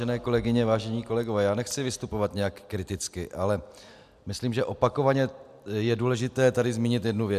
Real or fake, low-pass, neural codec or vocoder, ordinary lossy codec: real; 14.4 kHz; none; MP3, 96 kbps